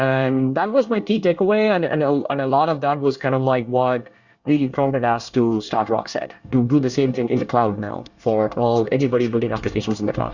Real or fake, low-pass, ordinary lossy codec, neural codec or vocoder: fake; 7.2 kHz; Opus, 64 kbps; codec, 24 kHz, 1 kbps, SNAC